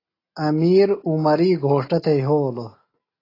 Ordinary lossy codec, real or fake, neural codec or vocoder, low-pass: AAC, 24 kbps; real; none; 5.4 kHz